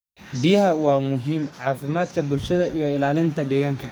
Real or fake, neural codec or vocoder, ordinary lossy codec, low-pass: fake; codec, 44.1 kHz, 2.6 kbps, SNAC; none; none